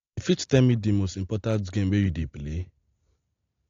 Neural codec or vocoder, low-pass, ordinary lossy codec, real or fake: none; 7.2 kHz; AAC, 48 kbps; real